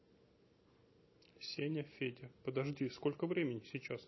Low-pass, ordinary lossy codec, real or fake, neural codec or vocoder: 7.2 kHz; MP3, 24 kbps; real; none